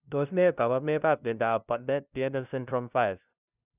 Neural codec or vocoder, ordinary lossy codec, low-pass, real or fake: codec, 16 kHz, 0.5 kbps, FunCodec, trained on LibriTTS, 25 frames a second; none; 3.6 kHz; fake